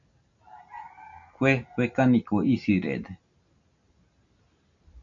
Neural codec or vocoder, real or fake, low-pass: none; real; 7.2 kHz